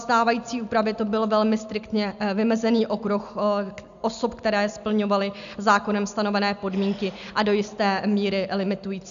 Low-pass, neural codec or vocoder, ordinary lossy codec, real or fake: 7.2 kHz; none; MP3, 96 kbps; real